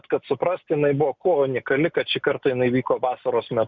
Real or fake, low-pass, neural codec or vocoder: real; 7.2 kHz; none